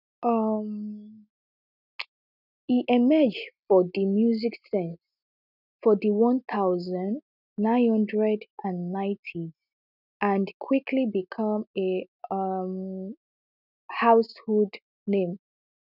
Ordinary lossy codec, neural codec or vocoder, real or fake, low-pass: none; none; real; 5.4 kHz